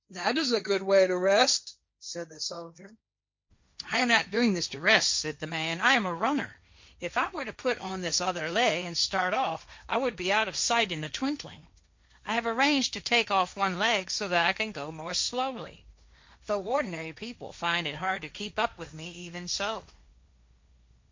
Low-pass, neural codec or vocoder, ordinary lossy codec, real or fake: 7.2 kHz; codec, 16 kHz, 1.1 kbps, Voila-Tokenizer; MP3, 48 kbps; fake